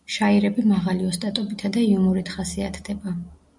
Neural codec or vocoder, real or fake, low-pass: none; real; 10.8 kHz